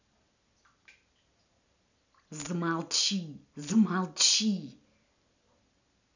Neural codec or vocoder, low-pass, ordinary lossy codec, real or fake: none; 7.2 kHz; none; real